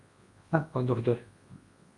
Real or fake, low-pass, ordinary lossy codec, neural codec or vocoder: fake; 10.8 kHz; AAC, 48 kbps; codec, 24 kHz, 0.9 kbps, WavTokenizer, large speech release